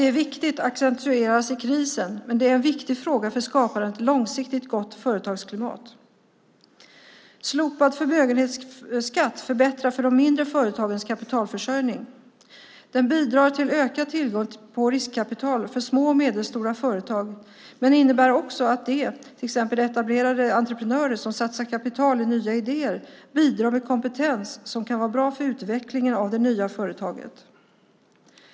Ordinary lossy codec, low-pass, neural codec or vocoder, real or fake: none; none; none; real